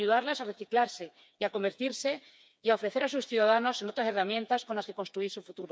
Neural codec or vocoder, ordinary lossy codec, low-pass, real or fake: codec, 16 kHz, 4 kbps, FreqCodec, smaller model; none; none; fake